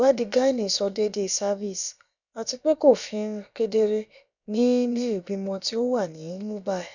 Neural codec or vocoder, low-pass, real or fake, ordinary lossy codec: codec, 16 kHz, about 1 kbps, DyCAST, with the encoder's durations; 7.2 kHz; fake; none